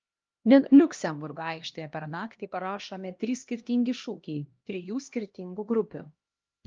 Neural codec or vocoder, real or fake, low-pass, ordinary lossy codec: codec, 16 kHz, 1 kbps, X-Codec, HuBERT features, trained on LibriSpeech; fake; 7.2 kHz; Opus, 24 kbps